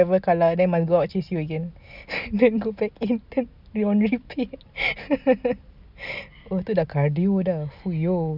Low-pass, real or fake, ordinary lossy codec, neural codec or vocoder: 5.4 kHz; real; none; none